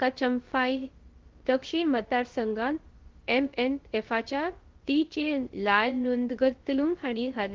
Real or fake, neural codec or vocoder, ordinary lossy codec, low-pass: fake; codec, 16 kHz, about 1 kbps, DyCAST, with the encoder's durations; Opus, 16 kbps; 7.2 kHz